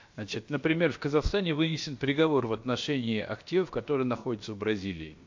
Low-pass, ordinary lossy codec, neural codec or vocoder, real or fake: 7.2 kHz; MP3, 48 kbps; codec, 16 kHz, about 1 kbps, DyCAST, with the encoder's durations; fake